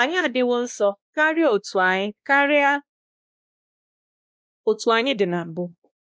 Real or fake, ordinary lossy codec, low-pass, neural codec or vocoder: fake; none; none; codec, 16 kHz, 1 kbps, X-Codec, WavLM features, trained on Multilingual LibriSpeech